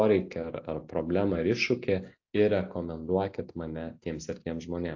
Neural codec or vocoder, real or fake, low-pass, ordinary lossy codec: none; real; 7.2 kHz; AAC, 48 kbps